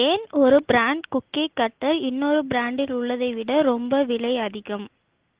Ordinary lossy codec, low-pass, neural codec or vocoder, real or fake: Opus, 16 kbps; 3.6 kHz; none; real